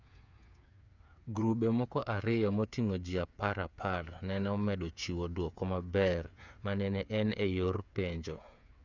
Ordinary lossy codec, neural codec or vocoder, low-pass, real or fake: none; codec, 16 kHz, 8 kbps, FreqCodec, smaller model; 7.2 kHz; fake